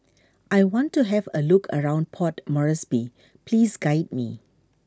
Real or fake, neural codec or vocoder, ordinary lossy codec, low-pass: real; none; none; none